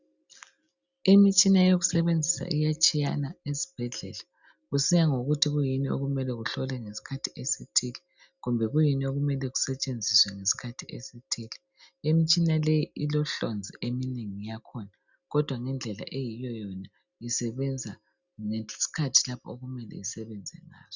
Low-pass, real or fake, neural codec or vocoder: 7.2 kHz; real; none